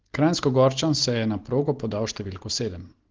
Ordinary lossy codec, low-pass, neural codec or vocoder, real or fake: Opus, 16 kbps; 7.2 kHz; none; real